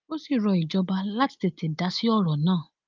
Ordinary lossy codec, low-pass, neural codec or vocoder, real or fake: Opus, 24 kbps; 7.2 kHz; none; real